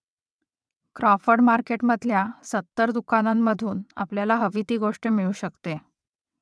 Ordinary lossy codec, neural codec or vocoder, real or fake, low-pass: none; vocoder, 22.05 kHz, 80 mel bands, WaveNeXt; fake; none